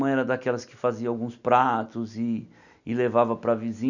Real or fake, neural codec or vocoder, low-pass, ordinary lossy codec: real; none; 7.2 kHz; none